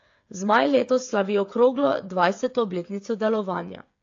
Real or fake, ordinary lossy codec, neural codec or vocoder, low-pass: fake; AAC, 48 kbps; codec, 16 kHz, 8 kbps, FreqCodec, smaller model; 7.2 kHz